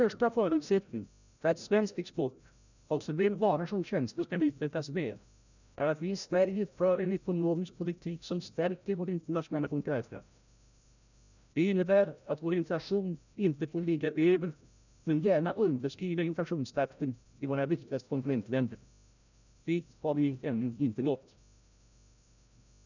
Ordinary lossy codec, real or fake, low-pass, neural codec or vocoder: none; fake; 7.2 kHz; codec, 16 kHz, 0.5 kbps, FreqCodec, larger model